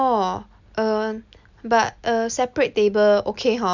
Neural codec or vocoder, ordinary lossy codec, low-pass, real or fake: none; none; 7.2 kHz; real